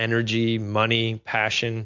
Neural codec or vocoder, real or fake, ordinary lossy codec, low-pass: none; real; MP3, 64 kbps; 7.2 kHz